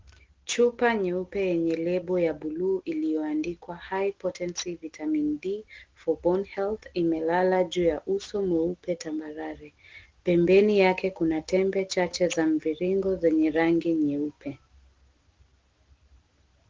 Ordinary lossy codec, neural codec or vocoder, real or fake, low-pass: Opus, 16 kbps; none; real; 7.2 kHz